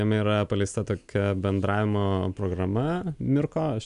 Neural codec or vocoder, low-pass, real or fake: none; 10.8 kHz; real